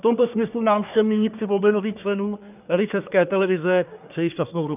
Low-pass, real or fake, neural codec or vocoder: 3.6 kHz; fake; codec, 24 kHz, 1 kbps, SNAC